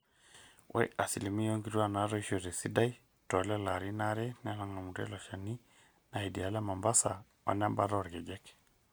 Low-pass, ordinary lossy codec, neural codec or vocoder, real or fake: none; none; none; real